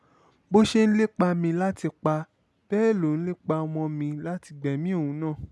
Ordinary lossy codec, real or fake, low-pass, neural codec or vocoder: none; real; none; none